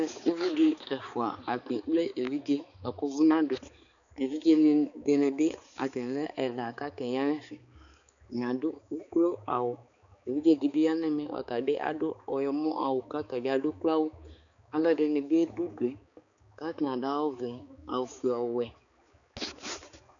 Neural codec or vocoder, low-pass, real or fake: codec, 16 kHz, 4 kbps, X-Codec, HuBERT features, trained on balanced general audio; 7.2 kHz; fake